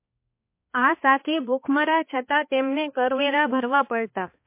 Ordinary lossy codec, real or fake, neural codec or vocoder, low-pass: MP3, 24 kbps; fake; codec, 16 kHz, 2 kbps, X-Codec, HuBERT features, trained on balanced general audio; 3.6 kHz